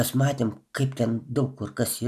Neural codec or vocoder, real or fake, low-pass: none; real; 14.4 kHz